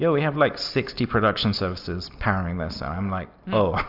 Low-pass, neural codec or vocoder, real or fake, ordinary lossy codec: 5.4 kHz; none; real; AAC, 48 kbps